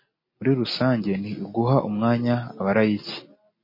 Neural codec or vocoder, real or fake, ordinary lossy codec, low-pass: none; real; MP3, 24 kbps; 5.4 kHz